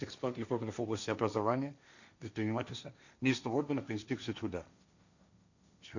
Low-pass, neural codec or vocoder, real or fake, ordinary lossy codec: 7.2 kHz; codec, 16 kHz, 1.1 kbps, Voila-Tokenizer; fake; none